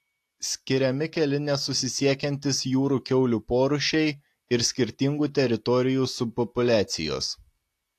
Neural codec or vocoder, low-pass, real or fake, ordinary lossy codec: none; 14.4 kHz; real; AAC, 64 kbps